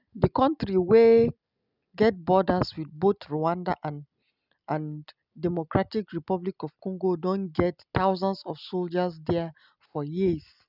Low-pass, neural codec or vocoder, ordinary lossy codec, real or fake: 5.4 kHz; none; none; real